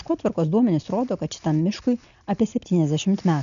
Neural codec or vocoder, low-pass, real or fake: none; 7.2 kHz; real